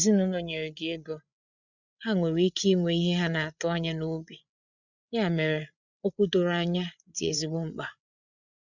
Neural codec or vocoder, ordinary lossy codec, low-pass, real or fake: codec, 16 kHz, 8 kbps, FreqCodec, larger model; none; 7.2 kHz; fake